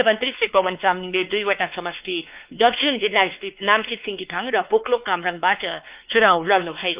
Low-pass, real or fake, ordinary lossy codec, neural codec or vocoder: 3.6 kHz; fake; Opus, 24 kbps; codec, 16 kHz, 2 kbps, X-Codec, WavLM features, trained on Multilingual LibriSpeech